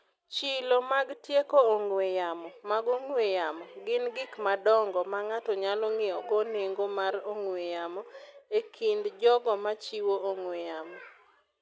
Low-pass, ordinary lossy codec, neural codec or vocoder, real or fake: none; none; none; real